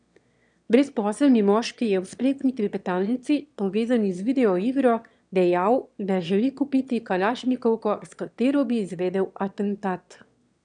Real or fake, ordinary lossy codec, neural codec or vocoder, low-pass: fake; none; autoencoder, 22.05 kHz, a latent of 192 numbers a frame, VITS, trained on one speaker; 9.9 kHz